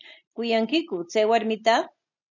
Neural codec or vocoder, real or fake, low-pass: none; real; 7.2 kHz